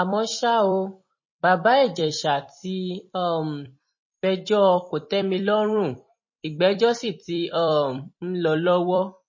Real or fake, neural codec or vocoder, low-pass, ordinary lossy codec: fake; vocoder, 44.1 kHz, 128 mel bands every 256 samples, BigVGAN v2; 7.2 kHz; MP3, 32 kbps